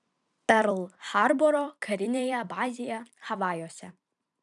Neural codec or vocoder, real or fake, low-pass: vocoder, 44.1 kHz, 128 mel bands every 256 samples, BigVGAN v2; fake; 10.8 kHz